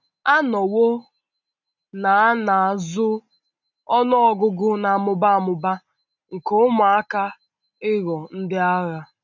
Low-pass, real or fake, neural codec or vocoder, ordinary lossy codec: 7.2 kHz; real; none; none